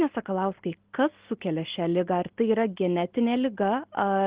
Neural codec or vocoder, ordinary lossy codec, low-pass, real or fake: none; Opus, 16 kbps; 3.6 kHz; real